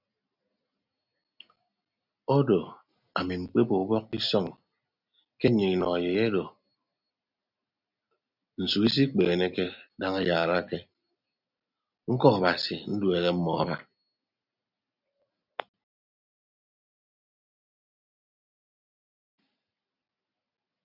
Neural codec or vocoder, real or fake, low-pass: none; real; 5.4 kHz